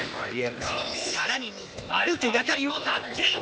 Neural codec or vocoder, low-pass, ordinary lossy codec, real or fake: codec, 16 kHz, 0.8 kbps, ZipCodec; none; none; fake